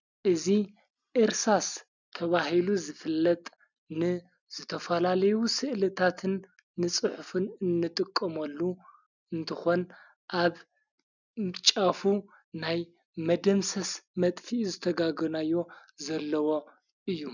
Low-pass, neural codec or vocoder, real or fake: 7.2 kHz; none; real